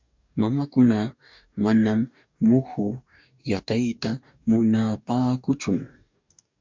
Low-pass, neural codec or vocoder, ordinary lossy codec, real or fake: 7.2 kHz; codec, 44.1 kHz, 2.6 kbps, DAC; MP3, 64 kbps; fake